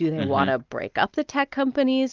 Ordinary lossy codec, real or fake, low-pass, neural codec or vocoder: Opus, 24 kbps; real; 7.2 kHz; none